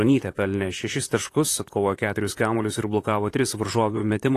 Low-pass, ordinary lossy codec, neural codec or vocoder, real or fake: 14.4 kHz; AAC, 48 kbps; vocoder, 44.1 kHz, 128 mel bands, Pupu-Vocoder; fake